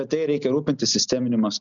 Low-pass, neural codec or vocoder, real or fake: 7.2 kHz; none; real